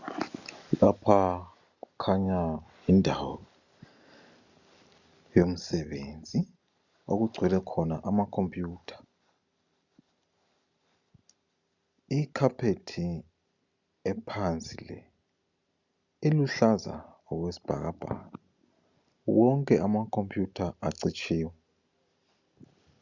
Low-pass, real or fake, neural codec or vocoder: 7.2 kHz; real; none